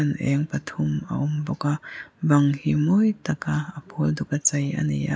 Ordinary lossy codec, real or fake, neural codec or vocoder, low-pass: none; real; none; none